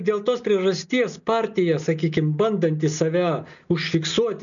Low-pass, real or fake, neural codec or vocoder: 7.2 kHz; real; none